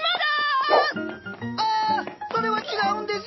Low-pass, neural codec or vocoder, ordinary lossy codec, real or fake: 7.2 kHz; none; MP3, 24 kbps; real